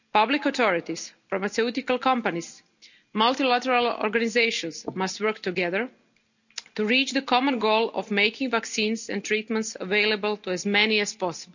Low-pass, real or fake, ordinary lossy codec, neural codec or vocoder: 7.2 kHz; real; none; none